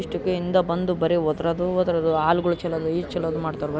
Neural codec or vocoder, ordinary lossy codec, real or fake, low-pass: none; none; real; none